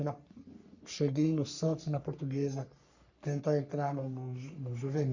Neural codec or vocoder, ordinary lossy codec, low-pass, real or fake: codec, 44.1 kHz, 3.4 kbps, Pupu-Codec; Opus, 64 kbps; 7.2 kHz; fake